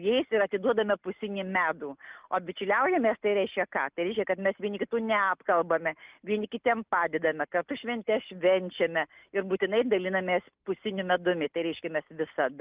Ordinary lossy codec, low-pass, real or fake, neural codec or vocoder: Opus, 32 kbps; 3.6 kHz; real; none